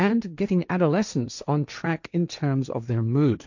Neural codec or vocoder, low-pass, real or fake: codec, 16 kHz, 1.1 kbps, Voila-Tokenizer; 7.2 kHz; fake